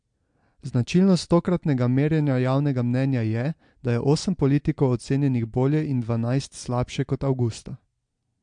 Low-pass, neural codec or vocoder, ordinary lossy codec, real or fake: 9.9 kHz; none; MP3, 64 kbps; real